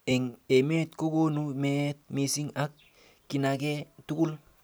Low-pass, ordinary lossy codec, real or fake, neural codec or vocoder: none; none; real; none